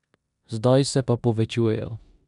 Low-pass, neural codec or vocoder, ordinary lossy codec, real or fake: 10.8 kHz; codec, 16 kHz in and 24 kHz out, 0.9 kbps, LongCat-Audio-Codec, four codebook decoder; none; fake